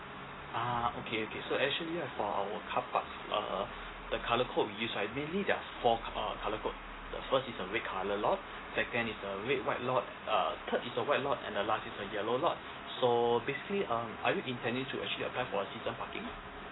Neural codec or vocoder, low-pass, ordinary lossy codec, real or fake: none; 7.2 kHz; AAC, 16 kbps; real